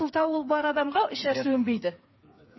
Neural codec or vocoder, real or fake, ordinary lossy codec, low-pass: codec, 16 kHz, 8 kbps, FreqCodec, smaller model; fake; MP3, 24 kbps; 7.2 kHz